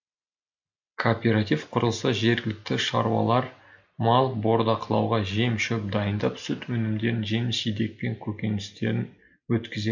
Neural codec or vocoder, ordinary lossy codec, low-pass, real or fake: none; MP3, 64 kbps; 7.2 kHz; real